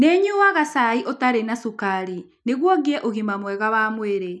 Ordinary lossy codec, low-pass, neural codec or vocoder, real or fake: none; none; none; real